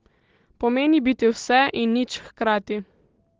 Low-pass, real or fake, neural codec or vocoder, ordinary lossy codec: 7.2 kHz; real; none; Opus, 16 kbps